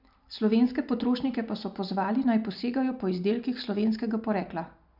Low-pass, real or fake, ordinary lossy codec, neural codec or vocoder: 5.4 kHz; real; none; none